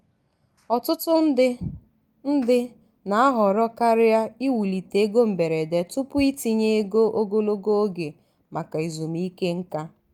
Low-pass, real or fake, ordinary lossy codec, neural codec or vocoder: 19.8 kHz; real; Opus, 32 kbps; none